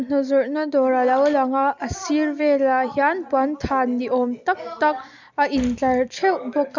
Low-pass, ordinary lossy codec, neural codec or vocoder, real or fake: 7.2 kHz; MP3, 64 kbps; none; real